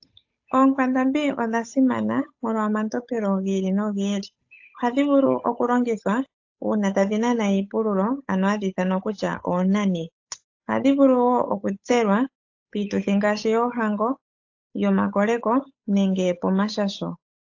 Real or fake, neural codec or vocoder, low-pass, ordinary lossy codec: fake; codec, 16 kHz, 8 kbps, FunCodec, trained on Chinese and English, 25 frames a second; 7.2 kHz; AAC, 48 kbps